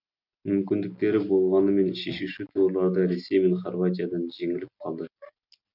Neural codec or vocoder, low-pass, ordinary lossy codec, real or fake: none; 5.4 kHz; none; real